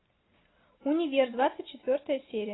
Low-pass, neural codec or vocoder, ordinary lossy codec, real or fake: 7.2 kHz; none; AAC, 16 kbps; real